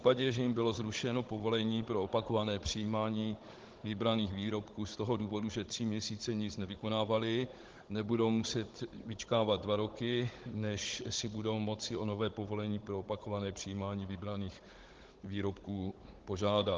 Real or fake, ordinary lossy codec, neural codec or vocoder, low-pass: fake; Opus, 16 kbps; codec, 16 kHz, 16 kbps, FunCodec, trained on Chinese and English, 50 frames a second; 7.2 kHz